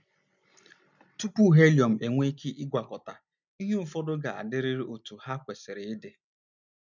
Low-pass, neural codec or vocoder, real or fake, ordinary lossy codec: 7.2 kHz; none; real; none